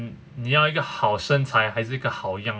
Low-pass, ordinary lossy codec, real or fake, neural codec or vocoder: none; none; real; none